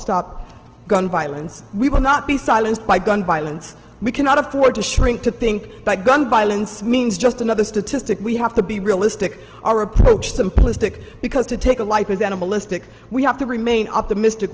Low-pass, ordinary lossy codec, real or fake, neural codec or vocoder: 7.2 kHz; Opus, 16 kbps; real; none